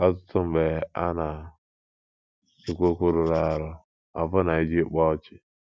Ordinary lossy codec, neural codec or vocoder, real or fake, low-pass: none; none; real; none